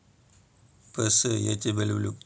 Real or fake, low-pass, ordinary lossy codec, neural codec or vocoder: real; none; none; none